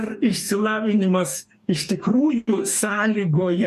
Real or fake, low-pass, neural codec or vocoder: fake; 14.4 kHz; codec, 44.1 kHz, 2.6 kbps, DAC